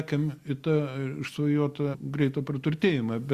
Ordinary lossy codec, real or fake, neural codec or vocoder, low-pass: Opus, 64 kbps; real; none; 14.4 kHz